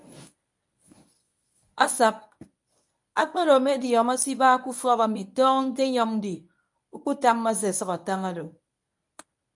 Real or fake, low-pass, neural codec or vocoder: fake; 10.8 kHz; codec, 24 kHz, 0.9 kbps, WavTokenizer, medium speech release version 1